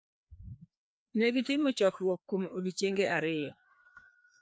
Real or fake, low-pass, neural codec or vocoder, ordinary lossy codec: fake; none; codec, 16 kHz, 2 kbps, FreqCodec, larger model; none